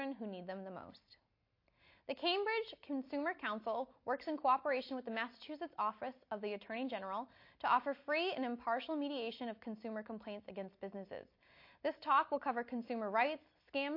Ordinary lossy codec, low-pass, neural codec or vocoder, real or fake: MP3, 32 kbps; 5.4 kHz; none; real